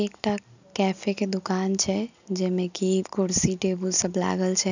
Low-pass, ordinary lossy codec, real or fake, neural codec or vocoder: 7.2 kHz; none; real; none